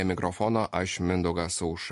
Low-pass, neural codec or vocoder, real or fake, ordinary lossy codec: 14.4 kHz; none; real; MP3, 48 kbps